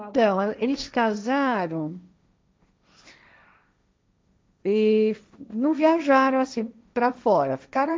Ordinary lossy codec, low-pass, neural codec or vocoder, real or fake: none; none; codec, 16 kHz, 1.1 kbps, Voila-Tokenizer; fake